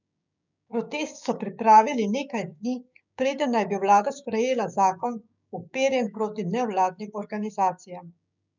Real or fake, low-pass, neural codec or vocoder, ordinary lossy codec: fake; 7.2 kHz; codec, 16 kHz, 6 kbps, DAC; none